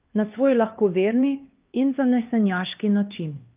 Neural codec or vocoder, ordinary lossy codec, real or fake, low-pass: codec, 16 kHz, 2 kbps, X-Codec, HuBERT features, trained on LibriSpeech; Opus, 24 kbps; fake; 3.6 kHz